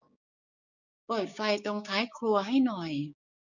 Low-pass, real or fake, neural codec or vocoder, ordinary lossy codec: 7.2 kHz; fake; codec, 16 kHz, 6 kbps, DAC; none